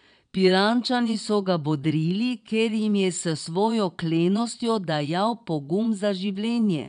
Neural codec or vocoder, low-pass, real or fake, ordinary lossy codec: vocoder, 22.05 kHz, 80 mel bands, Vocos; 9.9 kHz; fake; none